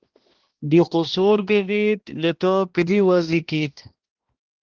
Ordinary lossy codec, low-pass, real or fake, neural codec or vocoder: Opus, 16 kbps; 7.2 kHz; fake; codec, 16 kHz, 1 kbps, X-Codec, HuBERT features, trained on balanced general audio